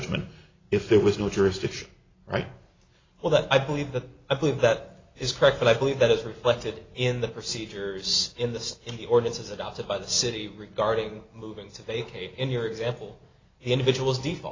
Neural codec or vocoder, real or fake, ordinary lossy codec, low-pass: none; real; AAC, 32 kbps; 7.2 kHz